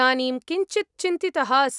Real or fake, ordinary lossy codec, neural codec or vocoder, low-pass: real; none; none; 10.8 kHz